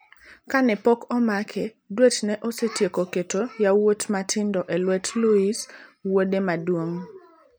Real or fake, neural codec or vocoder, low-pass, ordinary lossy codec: real; none; none; none